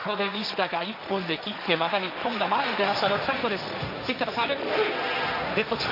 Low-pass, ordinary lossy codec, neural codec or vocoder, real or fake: 5.4 kHz; AAC, 48 kbps; codec, 16 kHz, 1.1 kbps, Voila-Tokenizer; fake